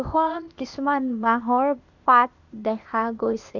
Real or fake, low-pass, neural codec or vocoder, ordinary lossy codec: fake; 7.2 kHz; codec, 16 kHz, 0.8 kbps, ZipCodec; none